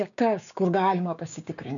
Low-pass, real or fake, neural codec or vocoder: 7.2 kHz; fake; codec, 16 kHz, 6 kbps, DAC